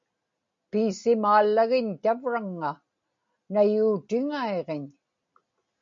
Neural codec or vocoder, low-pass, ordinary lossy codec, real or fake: none; 7.2 kHz; MP3, 48 kbps; real